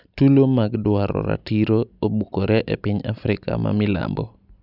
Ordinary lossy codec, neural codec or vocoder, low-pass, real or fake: none; none; 5.4 kHz; real